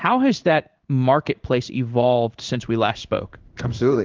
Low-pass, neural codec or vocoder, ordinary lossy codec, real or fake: 7.2 kHz; codec, 16 kHz in and 24 kHz out, 1 kbps, XY-Tokenizer; Opus, 24 kbps; fake